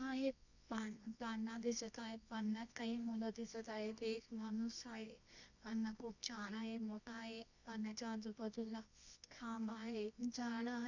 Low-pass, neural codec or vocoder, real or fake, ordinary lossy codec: 7.2 kHz; codec, 24 kHz, 0.9 kbps, WavTokenizer, medium music audio release; fake; none